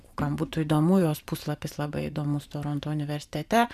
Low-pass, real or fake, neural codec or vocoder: 14.4 kHz; fake; vocoder, 44.1 kHz, 128 mel bands every 512 samples, BigVGAN v2